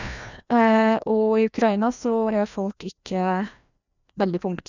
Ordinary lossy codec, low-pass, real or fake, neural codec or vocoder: none; 7.2 kHz; fake; codec, 16 kHz, 1 kbps, FreqCodec, larger model